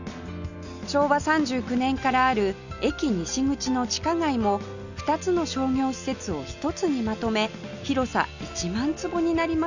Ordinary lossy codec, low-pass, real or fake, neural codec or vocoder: none; 7.2 kHz; real; none